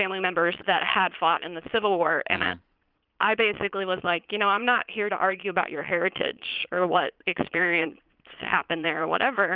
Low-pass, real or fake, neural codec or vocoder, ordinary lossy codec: 5.4 kHz; fake; vocoder, 22.05 kHz, 80 mel bands, Vocos; Opus, 24 kbps